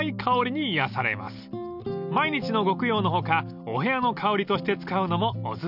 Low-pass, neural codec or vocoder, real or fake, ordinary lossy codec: 5.4 kHz; none; real; none